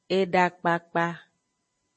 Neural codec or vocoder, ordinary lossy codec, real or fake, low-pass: none; MP3, 32 kbps; real; 10.8 kHz